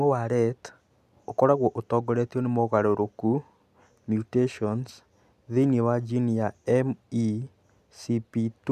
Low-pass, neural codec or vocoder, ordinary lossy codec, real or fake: 14.4 kHz; none; none; real